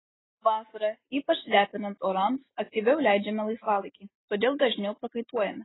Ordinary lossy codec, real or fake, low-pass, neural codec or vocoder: AAC, 16 kbps; real; 7.2 kHz; none